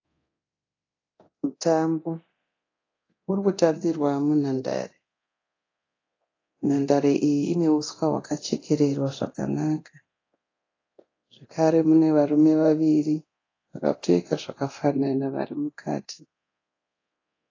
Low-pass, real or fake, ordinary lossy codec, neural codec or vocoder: 7.2 kHz; fake; AAC, 32 kbps; codec, 24 kHz, 0.9 kbps, DualCodec